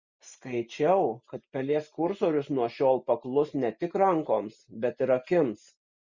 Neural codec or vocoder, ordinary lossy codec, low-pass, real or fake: none; Opus, 64 kbps; 7.2 kHz; real